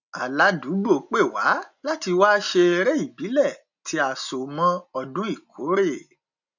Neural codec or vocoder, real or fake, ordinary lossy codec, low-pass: none; real; none; 7.2 kHz